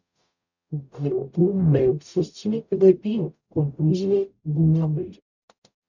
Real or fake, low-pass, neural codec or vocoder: fake; 7.2 kHz; codec, 44.1 kHz, 0.9 kbps, DAC